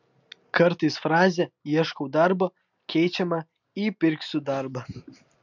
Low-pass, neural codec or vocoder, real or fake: 7.2 kHz; none; real